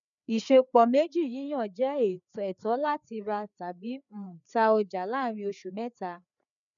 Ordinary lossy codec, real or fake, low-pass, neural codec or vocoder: none; fake; 7.2 kHz; codec, 16 kHz, 4 kbps, FreqCodec, larger model